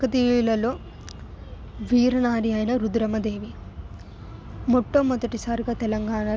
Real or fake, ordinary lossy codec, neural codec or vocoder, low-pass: real; none; none; none